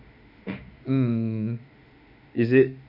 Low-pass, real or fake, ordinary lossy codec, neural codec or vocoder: 5.4 kHz; fake; none; autoencoder, 48 kHz, 32 numbers a frame, DAC-VAE, trained on Japanese speech